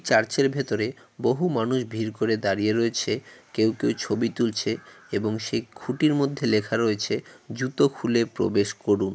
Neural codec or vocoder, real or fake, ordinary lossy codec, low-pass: none; real; none; none